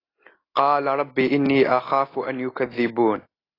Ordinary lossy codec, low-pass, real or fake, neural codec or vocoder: AAC, 24 kbps; 5.4 kHz; real; none